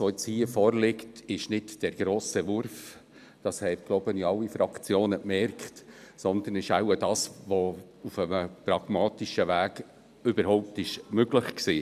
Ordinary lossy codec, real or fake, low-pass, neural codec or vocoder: none; real; 14.4 kHz; none